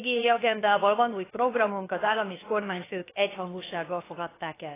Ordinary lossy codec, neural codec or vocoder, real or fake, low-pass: AAC, 16 kbps; codec, 16 kHz, about 1 kbps, DyCAST, with the encoder's durations; fake; 3.6 kHz